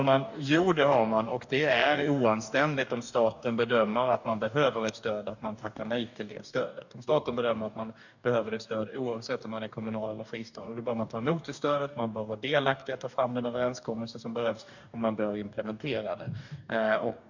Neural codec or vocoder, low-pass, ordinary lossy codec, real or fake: codec, 44.1 kHz, 2.6 kbps, DAC; 7.2 kHz; none; fake